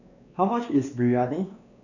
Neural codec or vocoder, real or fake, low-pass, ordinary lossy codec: codec, 16 kHz, 2 kbps, X-Codec, WavLM features, trained on Multilingual LibriSpeech; fake; 7.2 kHz; none